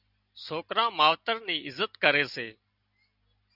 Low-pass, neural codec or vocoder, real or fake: 5.4 kHz; none; real